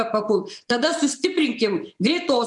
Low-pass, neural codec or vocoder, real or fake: 10.8 kHz; vocoder, 24 kHz, 100 mel bands, Vocos; fake